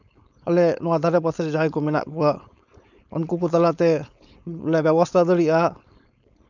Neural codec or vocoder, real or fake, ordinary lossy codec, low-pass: codec, 16 kHz, 4.8 kbps, FACodec; fake; none; 7.2 kHz